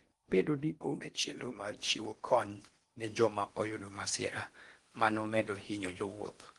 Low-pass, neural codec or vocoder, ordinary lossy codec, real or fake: 10.8 kHz; codec, 16 kHz in and 24 kHz out, 0.8 kbps, FocalCodec, streaming, 65536 codes; Opus, 24 kbps; fake